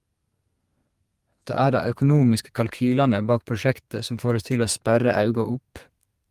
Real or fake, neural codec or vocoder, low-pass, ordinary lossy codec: fake; codec, 32 kHz, 1.9 kbps, SNAC; 14.4 kHz; Opus, 32 kbps